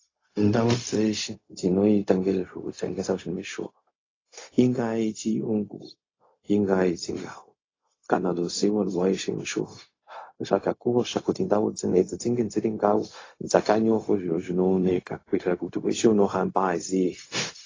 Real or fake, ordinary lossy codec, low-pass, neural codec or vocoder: fake; AAC, 32 kbps; 7.2 kHz; codec, 16 kHz, 0.4 kbps, LongCat-Audio-Codec